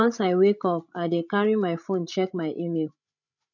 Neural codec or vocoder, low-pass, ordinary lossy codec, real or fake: codec, 16 kHz, 16 kbps, FreqCodec, larger model; 7.2 kHz; none; fake